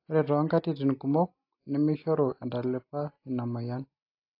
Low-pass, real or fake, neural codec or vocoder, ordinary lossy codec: 5.4 kHz; real; none; AAC, 32 kbps